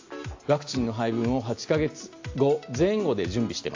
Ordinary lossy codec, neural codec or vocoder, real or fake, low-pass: AAC, 48 kbps; none; real; 7.2 kHz